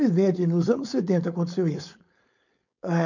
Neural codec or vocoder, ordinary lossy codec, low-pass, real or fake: codec, 16 kHz, 4.8 kbps, FACodec; none; 7.2 kHz; fake